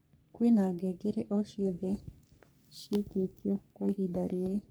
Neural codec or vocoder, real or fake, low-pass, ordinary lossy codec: codec, 44.1 kHz, 3.4 kbps, Pupu-Codec; fake; none; none